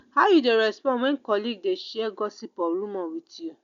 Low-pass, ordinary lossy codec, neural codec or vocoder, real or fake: 7.2 kHz; none; none; real